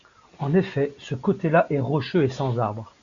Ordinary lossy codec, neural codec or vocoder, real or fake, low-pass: Opus, 64 kbps; none; real; 7.2 kHz